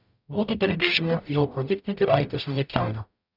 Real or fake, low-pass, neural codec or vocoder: fake; 5.4 kHz; codec, 44.1 kHz, 0.9 kbps, DAC